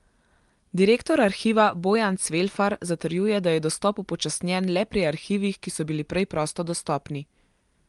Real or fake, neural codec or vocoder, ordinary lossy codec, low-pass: real; none; Opus, 32 kbps; 10.8 kHz